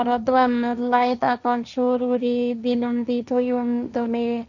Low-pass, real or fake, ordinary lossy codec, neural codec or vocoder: 7.2 kHz; fake; none; codec, 16 kHz, 1.1 kbps, Voila-Tokenizer